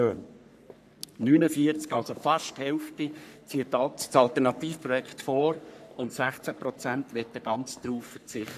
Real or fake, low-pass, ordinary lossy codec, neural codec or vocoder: fake; 14.4 kHz; none; codec, 44.1 kHz, 3.4 kbps, Pupu-Codec